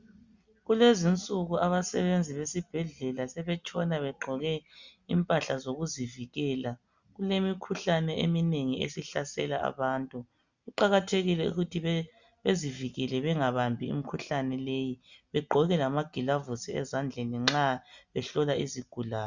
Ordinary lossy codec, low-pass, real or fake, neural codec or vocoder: Opus, 64 kbps; 7.2 kHz; real; none